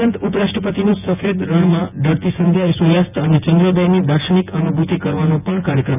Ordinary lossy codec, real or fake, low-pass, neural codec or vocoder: none; fake; 3.6 kHz; vocoder, 24 kHz, 100 mel bands, Vocos